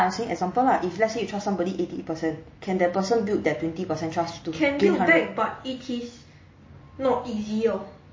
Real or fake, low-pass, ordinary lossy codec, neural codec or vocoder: real; 7.2 kHz; MP3, 32 kbps; none